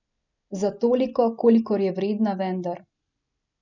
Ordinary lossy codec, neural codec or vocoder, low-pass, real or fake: none; none; 7.2 kHz; real